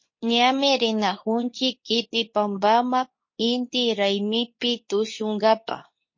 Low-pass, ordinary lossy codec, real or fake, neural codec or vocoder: 7.2 kHz; MP3, 32 kbps; fake; codec, 24 kHz, 0.9 kbps, WavTokenizer, medium speech release version 2